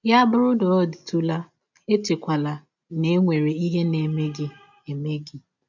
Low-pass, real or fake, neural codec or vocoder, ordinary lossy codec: 7.2 kHz; real; none; none